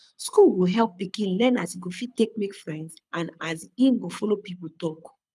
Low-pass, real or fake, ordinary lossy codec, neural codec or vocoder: none; fake; none; codec, 24 kHz, 6 kbps, HILCodec